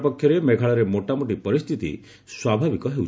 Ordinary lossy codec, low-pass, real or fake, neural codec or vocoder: none; none; real; none